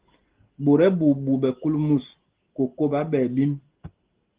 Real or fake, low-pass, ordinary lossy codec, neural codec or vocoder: real; 3.6 kHz; Opus, 16 kbps; none